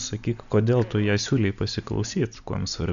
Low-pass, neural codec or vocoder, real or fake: 7.2 kHz; none; real